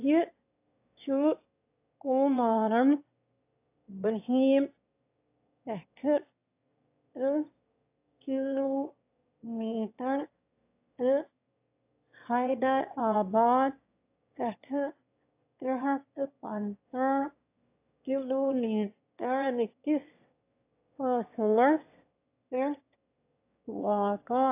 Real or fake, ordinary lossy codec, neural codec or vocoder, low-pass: fake; AAC, 32 kbps; autoencoder, 22.05 kHz, a latent of 192 numbers a frame, VITS, trained on one speaker; 3.6 kHz